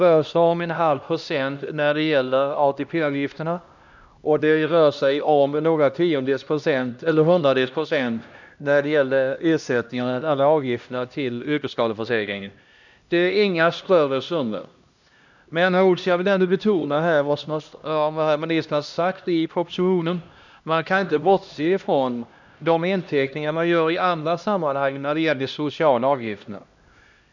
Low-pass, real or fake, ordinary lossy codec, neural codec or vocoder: 7.2 kHz; fake; none; codec, 16 kHz, 1 kbps, X-Codec, HuBERT features, trained on LibriSpeech